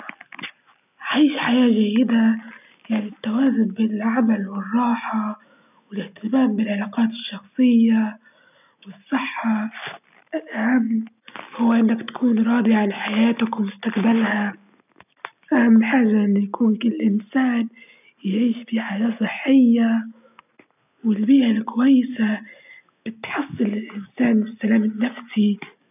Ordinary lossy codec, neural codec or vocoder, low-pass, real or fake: none; none; 3.6 kHz; real